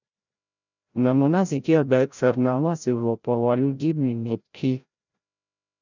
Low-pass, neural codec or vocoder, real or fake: 7.2 kHz; codec, 16 kHz, 0.5 kbps, FreqCodec, larger model; fake